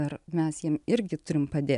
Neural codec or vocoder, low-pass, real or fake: none; 10.8 kHz; real